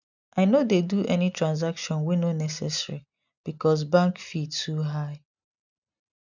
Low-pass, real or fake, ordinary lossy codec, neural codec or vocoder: 7.2 kHz; real; none; none